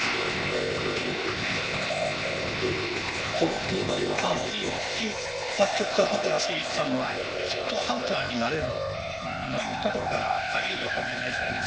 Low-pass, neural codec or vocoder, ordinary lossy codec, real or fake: none; codec, 16 kHz, 0.8 kbps, ZipCodec; none; fake